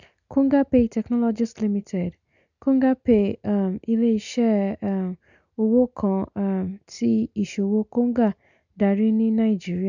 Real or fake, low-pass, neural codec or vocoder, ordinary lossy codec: real; 7.2 kHz; none; none